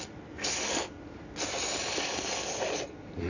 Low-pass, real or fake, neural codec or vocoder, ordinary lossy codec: 7.2 kHz; real; none; none